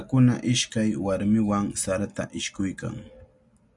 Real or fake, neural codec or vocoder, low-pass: real; none; 10.8 kHz